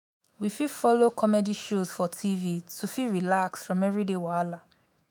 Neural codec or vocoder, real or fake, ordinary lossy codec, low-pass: autoencoder, 48 kHz, 128 numbers a frame, DAC-VAE, trained on Japanese speech; fake; none; none